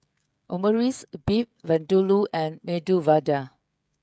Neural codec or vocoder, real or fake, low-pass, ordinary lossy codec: codec, 16 kHz, 16 kbps, FreqCodec, smaller model; fake; none; none